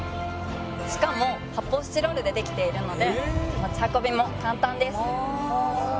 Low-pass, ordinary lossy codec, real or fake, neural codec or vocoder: none; none; real; none